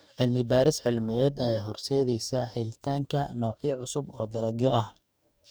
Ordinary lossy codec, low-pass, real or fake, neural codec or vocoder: none; none; fake; codec, 44.1 kHz, 2.6 kbps, DAC